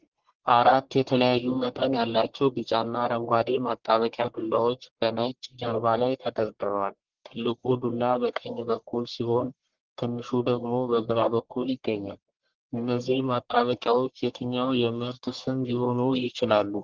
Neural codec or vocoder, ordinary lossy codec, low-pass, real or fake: codec, 44.1 kHz, 1.7 kbps, Pupu-Codec; Opus, 16 kbps; 7.2 kHz; fake